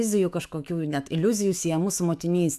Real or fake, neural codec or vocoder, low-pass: fake; autoencoder, 48 kHz, 128 numbers a frame, DAC-VAE, trained on Japanese speech; 14.4 kHz